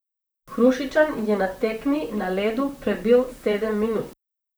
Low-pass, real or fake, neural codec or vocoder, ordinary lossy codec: none; fake; vocoder, 44.1 kHz, 128 mel bands, Pupu-Vocoder; none